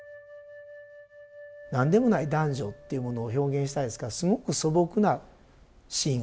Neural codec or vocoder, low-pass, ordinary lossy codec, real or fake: none; none; none; real